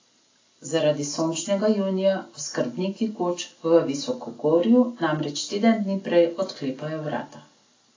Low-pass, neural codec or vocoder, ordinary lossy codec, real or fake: 7.2 kHz; none; AAC, 32 kbps; real